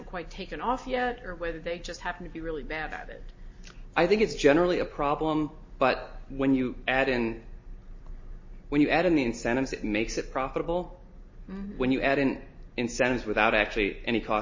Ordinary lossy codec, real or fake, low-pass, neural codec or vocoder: MP3, 32 kbps; real; 7.2 kHz; none